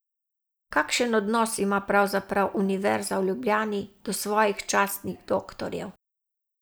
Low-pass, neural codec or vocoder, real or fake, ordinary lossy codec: none; none; real; none